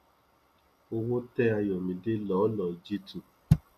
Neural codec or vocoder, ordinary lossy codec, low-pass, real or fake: none; none; 14.4 kHz; real